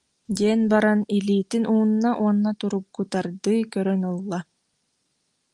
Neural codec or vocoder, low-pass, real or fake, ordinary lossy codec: none; 10.8 kHz; real; Opus, 32 kbps